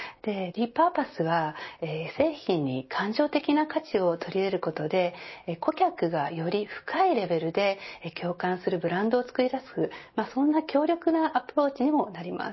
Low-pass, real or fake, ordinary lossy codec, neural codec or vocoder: 7.2 kHz; real; MP3, 24 kbps; none